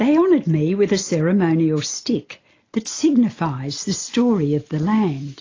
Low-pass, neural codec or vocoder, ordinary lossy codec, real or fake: 7.2 kHz; none; AAC, 32 kbps; real